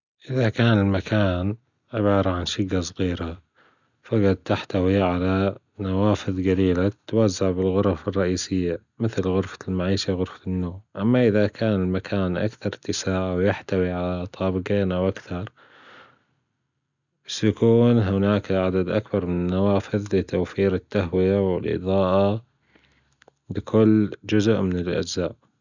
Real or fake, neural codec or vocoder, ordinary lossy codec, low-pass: real; none; none; 7.2 kHz